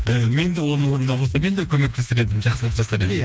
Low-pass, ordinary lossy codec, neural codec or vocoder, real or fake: none; none; codec, 16 kHz, 2 kbps, FreqCodec, smaller model; fake